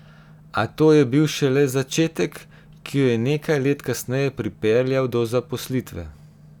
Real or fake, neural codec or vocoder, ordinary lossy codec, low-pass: real; none; none; 19.8 kHz